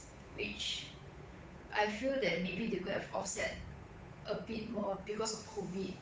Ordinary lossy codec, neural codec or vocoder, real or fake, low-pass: none; codec, 16 kHz, 8 kbps, FunCodec, trained on Chinese and English, 25 frames a second; fake; none